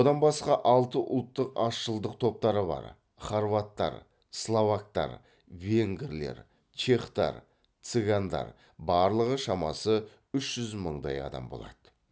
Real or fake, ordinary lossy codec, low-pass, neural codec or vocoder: real; none; none; none